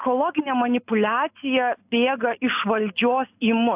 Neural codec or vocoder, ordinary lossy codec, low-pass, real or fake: none; AAC, 32 kbps; 3.6 kHz; real